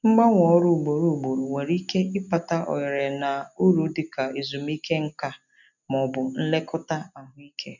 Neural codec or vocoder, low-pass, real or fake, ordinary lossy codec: none; 7.2 kHz; real; none